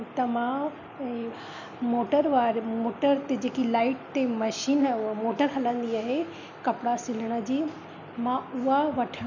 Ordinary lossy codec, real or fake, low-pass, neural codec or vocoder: none; real; 7.2 kHz; none